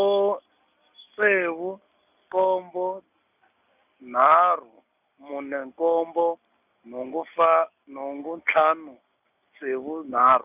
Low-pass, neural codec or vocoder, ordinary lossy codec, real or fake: 3.6 kHz; none; none; real